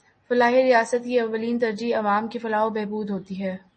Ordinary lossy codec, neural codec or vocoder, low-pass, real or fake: MP3, 32 kbps; none; 10.8 kHz; real